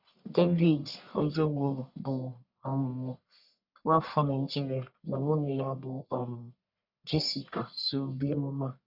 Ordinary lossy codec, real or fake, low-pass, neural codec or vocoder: none; fake; 5.4 kHz; codec, 44.1 kHz, 1.7 kbps, Pupu-Codec